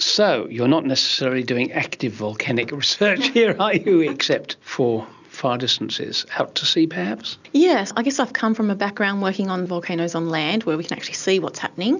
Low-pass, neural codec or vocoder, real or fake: 7.2 kHz; none; real